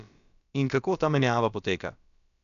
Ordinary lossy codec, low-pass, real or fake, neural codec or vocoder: none; 7.2 kHz; fake; codec, 16 kHz, about 1 kbps, DyCAST, with the encoder's durations